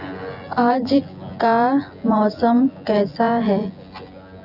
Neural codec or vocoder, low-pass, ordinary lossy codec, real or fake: vocoder, 24 kHz, 100 mel bands, Vocos; 5.4 kHz; none; fake